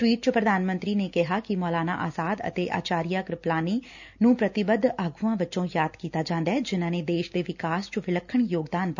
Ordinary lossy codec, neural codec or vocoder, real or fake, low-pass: none; none; real; 7.2 kHz